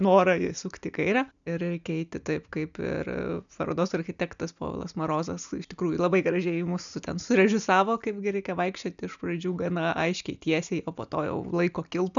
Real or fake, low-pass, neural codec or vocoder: real; 7.2 kHz; none